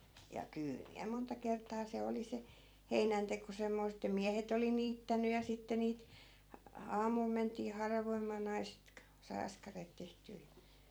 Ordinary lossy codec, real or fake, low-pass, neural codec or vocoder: none; fake; none; vocoder, 44.1 kHz, 128 mel bands every 256 samples, BigVGAN v2